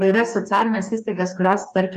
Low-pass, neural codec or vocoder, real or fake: 14.4 kHz; codec, 44.1 kHz, 2.6 kbps, DAC; fake